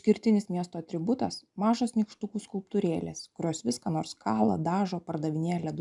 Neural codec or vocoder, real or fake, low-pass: none; real; 10.8 kHz